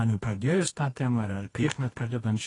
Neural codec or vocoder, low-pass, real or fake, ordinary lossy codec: codec, 24 kHz, 0.9 kbps, WavTokenizer, medium music audio release; 10.8 kHz; fake; AAC, 32 kbps